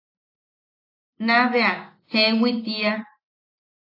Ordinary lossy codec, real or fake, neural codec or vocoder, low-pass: AAC, 32 kbps; real; none; 5.4 kHz